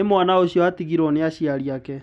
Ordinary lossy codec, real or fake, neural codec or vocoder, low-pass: none; real; none; none